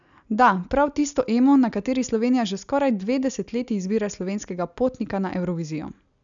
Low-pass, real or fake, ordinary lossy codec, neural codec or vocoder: 7.2 kHz; real; MP3, 96 kbps; none